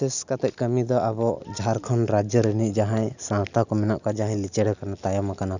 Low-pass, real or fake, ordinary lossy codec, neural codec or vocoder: 7.2 kHz; real; none; none